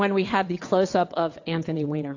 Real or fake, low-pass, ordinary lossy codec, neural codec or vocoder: fake; 7.2 kHz; AAC, 48 kbps; vocoder, 22.05 kHz, 80 mel bands, WaveNeXt